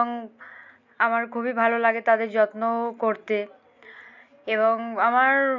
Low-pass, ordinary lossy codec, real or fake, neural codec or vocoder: 7.2 kHz; none; real; none